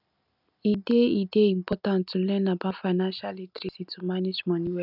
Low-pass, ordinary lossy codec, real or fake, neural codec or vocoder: 5.4 kHz; none; real; none